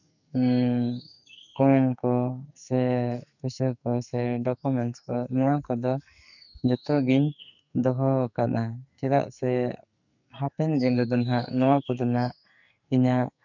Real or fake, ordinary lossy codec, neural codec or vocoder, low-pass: fake; none; codec, 44.1 kHz, 2.6 kbps, SNAC; 7.2 kHz